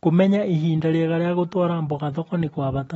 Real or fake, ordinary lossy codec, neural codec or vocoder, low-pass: real; AAC, 24 kbps; none; 19.8 kHz